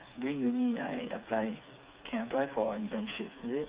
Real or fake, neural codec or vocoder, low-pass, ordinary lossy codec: fake; codec, 16 kHz, 4 kbps, FreqCodec, smaller model; 3.6 kHz; Opus, 64 kbps